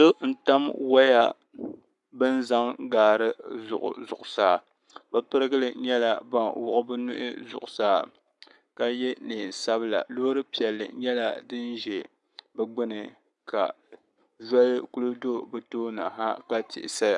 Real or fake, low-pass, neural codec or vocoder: fake; 10.8 kHz; codec, 44.1 kHz, 7.8 kbps, Pupu-Codec